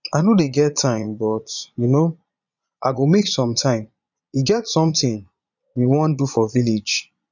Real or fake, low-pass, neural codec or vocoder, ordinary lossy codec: fake; 7.2 kHz; vocoder, 22.05 kHz, 80 mel bands, Vocos; none